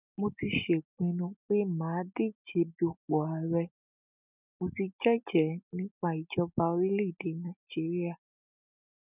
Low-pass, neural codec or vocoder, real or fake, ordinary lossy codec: 3.6 kHz; none; real; none